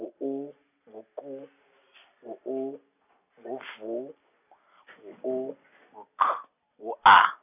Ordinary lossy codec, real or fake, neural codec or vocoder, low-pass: none; real; none; 3.6 kHz